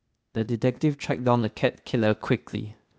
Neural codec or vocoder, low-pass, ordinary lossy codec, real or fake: codec, 16 kHz, 0.8 kbps, ZipCodec; none; none; fake